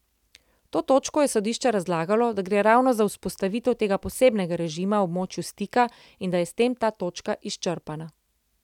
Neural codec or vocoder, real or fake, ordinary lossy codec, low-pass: none; real; none; 19.8 kHz